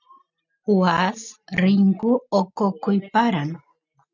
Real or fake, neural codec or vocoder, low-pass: real; none; 7.2 kHz